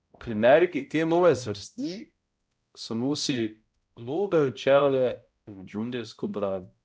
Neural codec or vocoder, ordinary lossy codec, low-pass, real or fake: codec, 16 kHz, 0.5 kbps, X-Codec, HuBERT features, trained on balanced general audio; none; none; fake